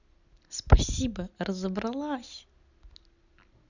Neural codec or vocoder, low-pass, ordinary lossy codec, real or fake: none; 7.2 kHz; none; real